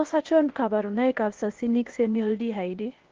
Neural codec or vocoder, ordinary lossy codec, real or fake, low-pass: codec, 16 kHz, 0.8 kbps, ZipCodec; Opus, 16 kbps; fake; 7.2 kHz